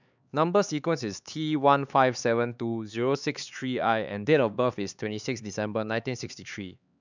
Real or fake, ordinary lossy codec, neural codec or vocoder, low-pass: fake; none; codec, 16 kHz, 4 kbps, X-Codec, HuBERT features, trained on LibriSpeech; 7.2 kHz